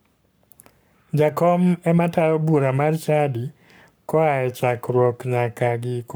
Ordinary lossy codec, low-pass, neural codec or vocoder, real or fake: none; none; codec, 44.1 kHz, 7.8 kbps, Pupu-Codec; fake